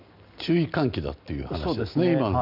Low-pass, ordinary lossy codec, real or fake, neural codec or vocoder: 5.4 kHz; none; real; none